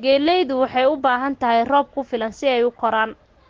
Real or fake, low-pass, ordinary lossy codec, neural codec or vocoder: real; 7.2 kHz; Opus, 16 kbps; none